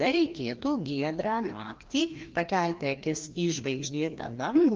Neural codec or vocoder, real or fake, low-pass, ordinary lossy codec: codec, 16 kHz, 1 kbps, FreqCodec, larger model; fake; 7.2 kHz; Opus, 32 kbps